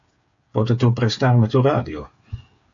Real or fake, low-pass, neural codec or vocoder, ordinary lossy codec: fake; 7.2 kHz; codec, 16 kHz, 8 kbps, FreqCodec, smaller model; AAC, 48 kbps